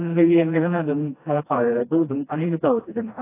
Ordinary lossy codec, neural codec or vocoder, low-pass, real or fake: AAC, 24 kbps; codec, 16 kHz, 1 kbps, FreqCodec, smaller model; 3.6 kHz; fake